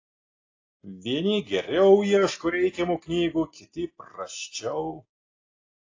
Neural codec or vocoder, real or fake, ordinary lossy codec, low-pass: none; real; AAC, 32 kbps; 7.2 kHz